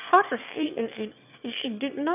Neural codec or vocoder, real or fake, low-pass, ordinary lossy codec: autoencoder, 22.05 kHz, a latent of 192 numbers a frame, VITS, trained on one speaker; fake; 3.6 kHz; none